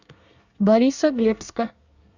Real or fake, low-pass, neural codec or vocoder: fake; 7.2 kHz; codec, 24 kHz, 1 kbps, SNAC